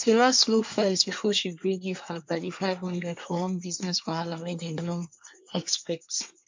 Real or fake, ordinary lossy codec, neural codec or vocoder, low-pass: fake; MP3, 48 kbps; codec, 24 kHz, 1 kbps, SNAC; 7.2 kHz